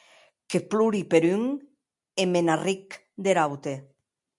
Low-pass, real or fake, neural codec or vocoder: 10.8 kHz; real; none